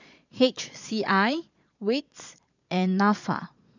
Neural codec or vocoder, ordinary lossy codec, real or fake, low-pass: vocoder, 22.05 kHz, 80 mel bands, Vocos; none; fake; 7.2 kHz